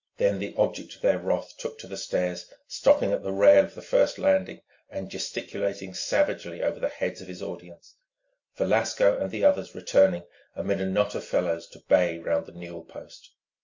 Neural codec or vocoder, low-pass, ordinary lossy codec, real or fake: none; 7.2 kHz; MP3, 48 kbps; real